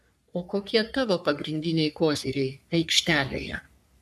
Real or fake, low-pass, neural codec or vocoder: fake; 14.4 kHz; codec, 44.1 kHz, 3.4 kbps, Pupu-Codec